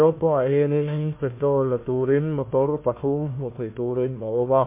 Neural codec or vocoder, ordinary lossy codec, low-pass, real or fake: codec, 16 kHz, 1 kbps, FunCodec, trained on Chinese and English, 50 frames a second; MP3, 24 kbps; 3.6 kHz; fake